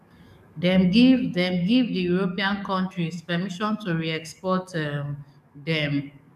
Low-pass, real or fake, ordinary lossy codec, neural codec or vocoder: 14.4 kHz; fake; none; codec, 44.1 kHz, 7.8 kbps, DAC